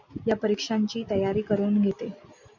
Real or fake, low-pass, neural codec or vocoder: real; 7.2 kHz; none